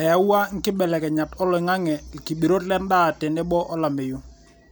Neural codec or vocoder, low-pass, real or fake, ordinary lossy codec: none; none; real; none